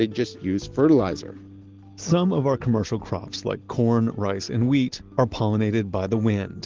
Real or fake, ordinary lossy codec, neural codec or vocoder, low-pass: real; Opus, 16 kbps; none; 7.2 kHz